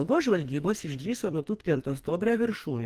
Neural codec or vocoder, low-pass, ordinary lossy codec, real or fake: codec, 44.1 kHz, 2.6 kbps, SNAC; 14.4 kHz; Opus, 24 kbps; fake